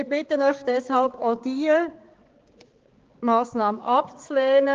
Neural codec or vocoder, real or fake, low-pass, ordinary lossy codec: codec, 16 kHz, 4 kbps, X-Codec, HuBERT features, trained on balanced general audio; fake; 7.2 kHz; Opus, 16 kbps